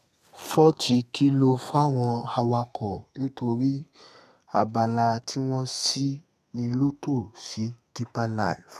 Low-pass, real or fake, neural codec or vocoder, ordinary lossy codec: 14.4 kHz; fake; codec, 32 kHz, 1.9 kbps, SNAC; none